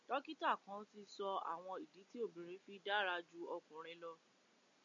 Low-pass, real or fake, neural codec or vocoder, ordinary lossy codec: 7.2 kHz; real; none; MP3, 64 kbps